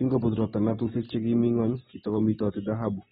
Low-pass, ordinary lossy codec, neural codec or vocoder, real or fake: 19.8 kHz; AAC, 16 kbps; none; real